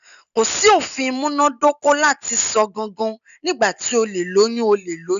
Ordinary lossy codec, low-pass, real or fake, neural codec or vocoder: none; 7.2 kHz; real; none